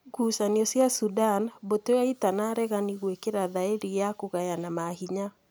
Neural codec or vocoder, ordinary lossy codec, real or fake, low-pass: none; none; real; none